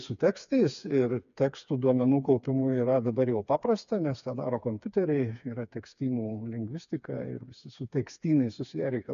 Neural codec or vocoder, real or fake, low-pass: codec, 16 kHz, 4 kbps, FreqCodec, smaller model; fake; 7.2 kHz